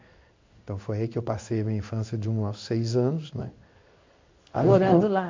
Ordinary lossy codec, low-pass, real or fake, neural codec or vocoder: MP3, 48 kbps; 7.2 kHz; fake; codec, 16 kHz in and 24 kHz out, 1 kbps, XY-Tokenizer